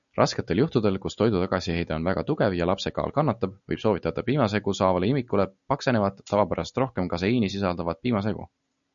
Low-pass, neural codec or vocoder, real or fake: 7.2 kHz; none; real